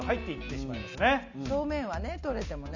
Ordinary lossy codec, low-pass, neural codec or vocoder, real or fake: none; 7.2 kHz; none; real